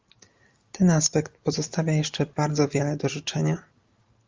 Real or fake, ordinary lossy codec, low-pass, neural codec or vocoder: real; Opus, 32 kbps; 7.2 kHz; none